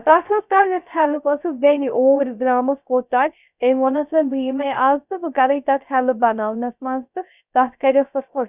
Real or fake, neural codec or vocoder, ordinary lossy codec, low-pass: fake; codec, 16 kHz, 0.3 kbps, FocalCodec; none; 3.6 kHz